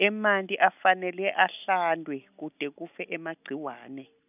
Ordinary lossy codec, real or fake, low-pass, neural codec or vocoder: none; real; 3.6 kHz; none